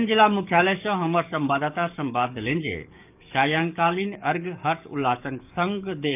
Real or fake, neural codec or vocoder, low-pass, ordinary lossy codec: fake; codec, 44.1 kHz, 7.8 kbps, DAC; 3.6 kHz; none